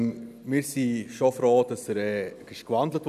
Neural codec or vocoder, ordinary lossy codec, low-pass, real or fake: none; none; 14.4 kHz; real